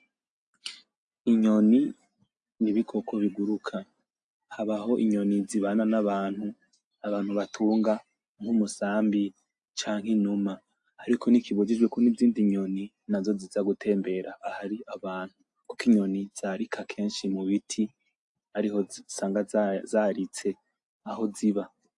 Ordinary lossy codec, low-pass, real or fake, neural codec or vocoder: AAC, 64 kbps; 10.8 kHz; real; none